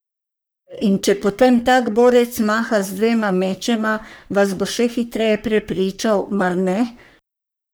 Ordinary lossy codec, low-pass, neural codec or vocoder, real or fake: none; none; codec, 44.1 kHz, 3.4 kbps, Pupu-Codec; fake